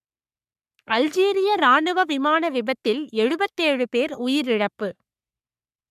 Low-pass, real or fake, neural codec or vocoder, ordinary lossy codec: 14.4 kHz; fake; codec, 44.1 kHz, 3.4 kbps, Pupu-Codec; none